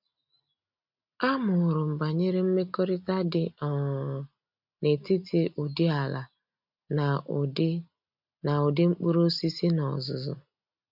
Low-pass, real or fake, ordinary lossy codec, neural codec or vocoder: 5.4 kHz; real; none; none